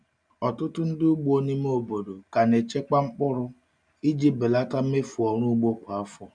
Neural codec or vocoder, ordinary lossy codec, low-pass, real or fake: none; none; 9.9 kHz; real